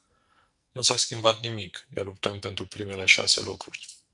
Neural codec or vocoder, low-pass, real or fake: codec, 44.1 kHz, 2.6 kbps, SNAC; 10.8 kHz; fake